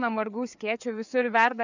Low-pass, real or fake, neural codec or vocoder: 7.2 kHz; fake; codec, 16 kHz, 4 kbps, FreqCodec, larger model